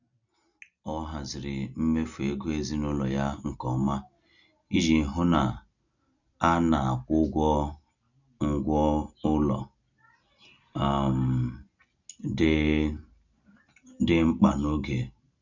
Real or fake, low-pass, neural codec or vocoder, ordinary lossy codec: real; 7.2 kHz; none; none